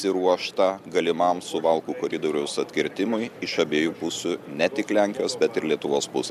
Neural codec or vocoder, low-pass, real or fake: none; 14.4 kHz; real